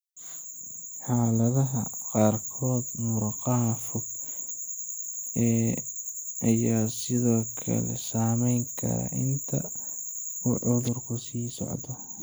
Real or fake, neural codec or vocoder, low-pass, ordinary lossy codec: real; none; none; none